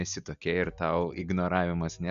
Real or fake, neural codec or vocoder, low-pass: real; none; 7.2 kHz